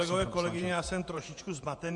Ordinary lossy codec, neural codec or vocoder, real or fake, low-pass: MP3, 64 kbps; vocoder, 48 kHz, 128 mel bands, Vocos; fake; 10.8 kHz